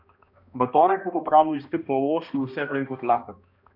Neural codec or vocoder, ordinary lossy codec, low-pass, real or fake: codec, 16 kHz, 1 kbps, X-Codec, HuBERT features, trained on balanced general audio; none; 5.4 kHz; fake